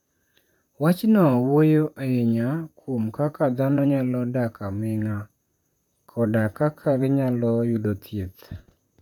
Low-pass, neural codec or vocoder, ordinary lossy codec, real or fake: 19.8 kHz; vocoder, 44.1 kHz, 128 mel bands, Pupu-Vocoder; none; fake